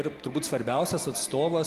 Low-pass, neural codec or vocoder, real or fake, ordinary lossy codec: 14.4 kHz; none; real; Opus, 24 kbps